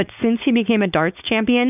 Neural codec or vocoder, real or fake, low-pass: codec, 16 kHz, 4.8 kbps, FACodec; fake; 3.6 kHz